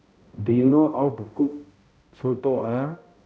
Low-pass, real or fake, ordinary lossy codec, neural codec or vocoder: none; fake; none; codec, 16 kHz, 0.5 kbps, X-Codec, HuBERT features, trained on balanced general audio